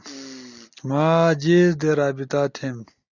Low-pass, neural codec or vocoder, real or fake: 7.2 kHz; none; real